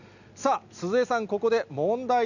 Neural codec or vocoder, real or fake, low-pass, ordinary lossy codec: none; real; 7.2 kHz; none